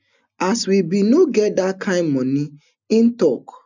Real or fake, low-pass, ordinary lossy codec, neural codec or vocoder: real; 7.2 kHz; none; none